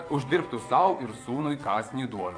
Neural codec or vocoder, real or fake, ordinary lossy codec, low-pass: vocoder, 22.05 kHz, 80 mel bands, WaveNeXt; fake; AAC, 48 kbps; 9.9 kHz